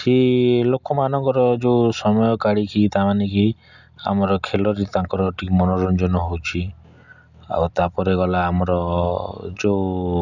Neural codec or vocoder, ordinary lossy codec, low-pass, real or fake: none; none; 7.2 kHz; real